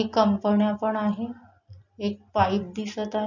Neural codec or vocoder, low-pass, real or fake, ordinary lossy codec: none; 7.2 kHz; real; none